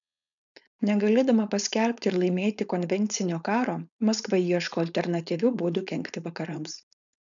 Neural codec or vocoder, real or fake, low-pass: codec, 16 kHz, 4.8 kbps, FACodec; fake; 7.2 kHz